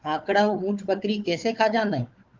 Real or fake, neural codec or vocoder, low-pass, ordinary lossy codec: fake; codec, 16 kHz, 4 kbps, FunCodec, trained on Chinese and English, 50 frames a second; 7.2 kHz; Opus, 32 kbps